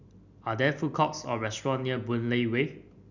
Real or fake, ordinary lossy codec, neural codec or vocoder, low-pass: real; none; none; 7.2 kHz